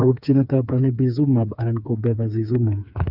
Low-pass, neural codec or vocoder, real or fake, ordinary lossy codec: 5.4 kHz; codec, 16 kHz, 4 kbps, FreqCodec, smaller model; fake; none